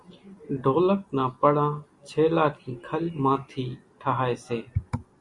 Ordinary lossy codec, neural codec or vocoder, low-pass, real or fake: Opus, 64 kbps; none; 10.8 kHz; real